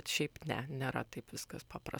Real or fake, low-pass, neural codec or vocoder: fake; 19.8 kHz; vocoder, 44.1 kHz, 128 mel bands every 256 samples, BigVGAN v2